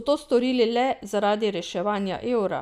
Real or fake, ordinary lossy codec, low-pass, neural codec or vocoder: real; none; 14.4 kHz; none